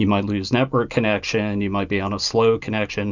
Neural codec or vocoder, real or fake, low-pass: none; real; 7.2 kHz